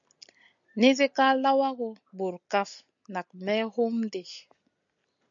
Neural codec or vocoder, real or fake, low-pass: none; real; 7.2 kHz